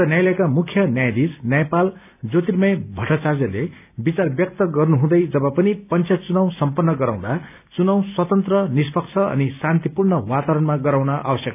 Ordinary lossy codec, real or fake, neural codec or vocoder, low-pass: none; real; none; 3.6 kHz